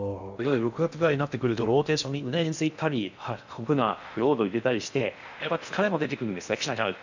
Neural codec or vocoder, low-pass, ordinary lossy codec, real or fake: codec, 16 kHz in and 24 kHz out, 0.6 kbps, FocalCodec, streaming, 2048 codes; 7.2 kHz; none; fake